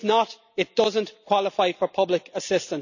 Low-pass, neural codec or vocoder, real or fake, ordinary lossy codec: 7.2 kHz; none; real; none